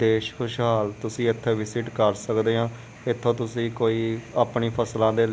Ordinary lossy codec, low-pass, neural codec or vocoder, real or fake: none; none; none; real